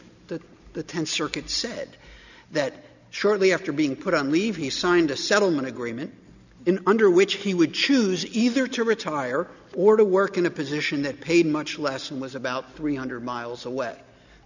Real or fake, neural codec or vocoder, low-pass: real; none; 7.2 kHz